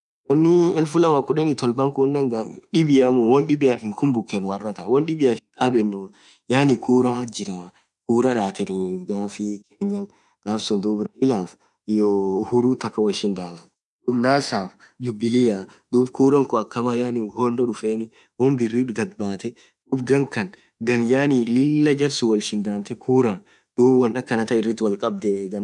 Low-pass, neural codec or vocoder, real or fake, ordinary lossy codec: 10.8 kHz; autoencoder, 48 kHz, 32 numbers a frame, DAC-VAE, trained on Japanese speech; fake; none